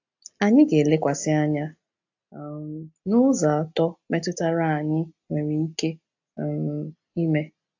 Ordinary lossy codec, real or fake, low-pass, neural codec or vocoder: AAC, 48 kbps; real; 7.2 kHz; none